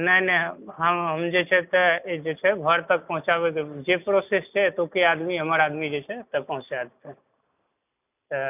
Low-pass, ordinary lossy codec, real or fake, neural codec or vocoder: 3.6 kHz; none; real; none